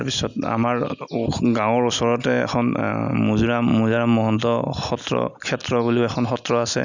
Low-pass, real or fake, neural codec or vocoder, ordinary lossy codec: 7.2 kHz; real; none; none